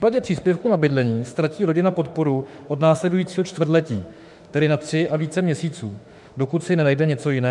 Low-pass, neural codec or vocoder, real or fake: 10.8 kHz; autoencoder, 48 kHz, 32 numbers a frame, DAC-VAE, trained on Japanese speech; fake